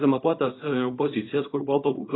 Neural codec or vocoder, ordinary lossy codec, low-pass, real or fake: codec, 24 kHz, 0.9 kbps, WavTokenizer, small release; AAC, 16 kbps; 7.2 kHz; fake